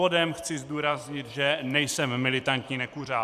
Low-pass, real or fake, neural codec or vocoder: 14.4 kHz; fake; vocoder, 44.1 kHz, 128 mel bands every 512 samples, BigVGAN v2